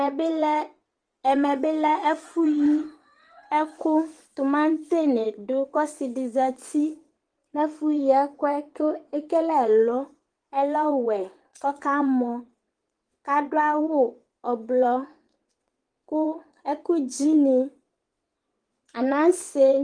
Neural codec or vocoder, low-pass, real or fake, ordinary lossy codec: vocoder, 22.05 kHz, 80 mel bands, WaveNeXt; 9.9 kHz; fake; Opus, 24 kbps